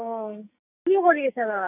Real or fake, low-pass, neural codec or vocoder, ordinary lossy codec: fake; 3.6 kHz; codec, 44.1 kHz, 2.6 kbps, SNAC; none